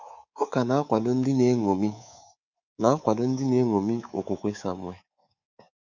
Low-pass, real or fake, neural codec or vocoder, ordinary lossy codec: 7.2 kHz; fake; codec, 24 kHz, 3.1 kbps, DualCodec; none